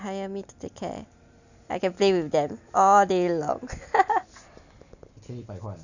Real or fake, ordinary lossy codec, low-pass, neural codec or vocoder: real; none; 7.2 kHz; none